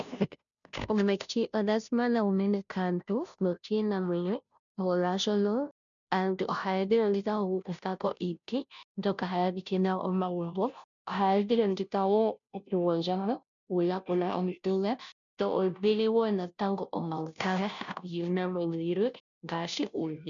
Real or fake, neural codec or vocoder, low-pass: fake; codec, 16 kHz, 0.5 kbps, FunCodec, trained on Chinese and English, 25 frames a second; 7.2 kHz